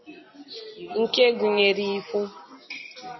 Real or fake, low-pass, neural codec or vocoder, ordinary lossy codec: real; 7.2 kHz; none; MP3, 24 kbps